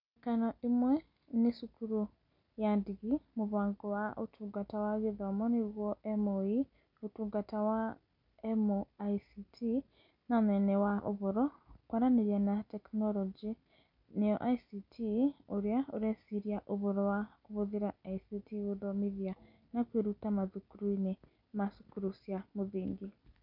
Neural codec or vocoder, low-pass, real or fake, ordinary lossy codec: none; 5.4 kHz; real; none